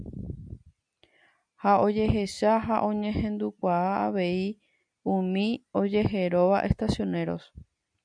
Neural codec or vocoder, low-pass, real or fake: none; 9.9 kHz; real